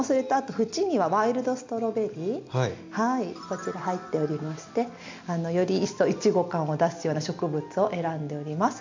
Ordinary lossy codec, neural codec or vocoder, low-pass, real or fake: MP3, 64 kbps; none; 7.2 kHz; real